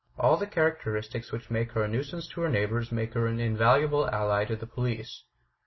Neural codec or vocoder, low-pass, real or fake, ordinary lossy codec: none; 7.2 kHz; real; MP3, 24 kbps